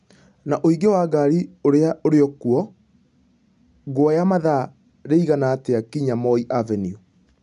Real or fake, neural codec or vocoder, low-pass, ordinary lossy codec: real; none; 10.8 kHz; none